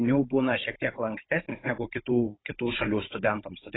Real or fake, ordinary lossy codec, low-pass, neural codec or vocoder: fake; AAC, 16 kbps; 7.2 kHz; codec, 16 kHz, 16 kbps, FunCodec, trained on Chinese and English, 50 frames a second